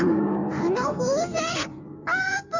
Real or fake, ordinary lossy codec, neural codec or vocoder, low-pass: fake; none; codec, 16 kHz in and 24 kHz out, 1.1 kbps, FireRedTTS-2 codec; 7.2 kHz